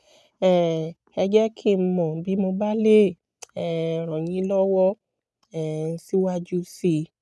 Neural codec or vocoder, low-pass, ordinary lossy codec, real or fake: none; none; none; real